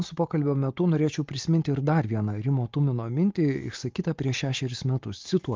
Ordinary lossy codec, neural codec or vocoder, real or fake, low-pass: Opus, 32 kbps; none; real; 7.2 kHz